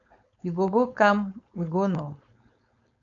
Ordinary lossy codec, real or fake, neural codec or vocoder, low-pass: AAC, 64 kbps; fake; codec, 16 kHz, 4.8 kbps, FACodec; 7.2 kHz